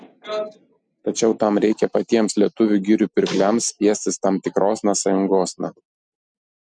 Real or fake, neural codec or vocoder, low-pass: real; none; 9.9 kHz